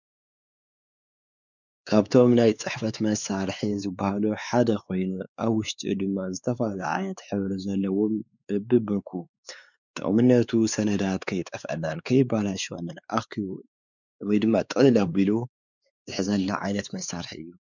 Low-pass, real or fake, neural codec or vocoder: 7.2 kHz; fake; codec, 16 kHz, 4 kbps, X-Codec, WavLM features, trained on Multilingual LibriSpeech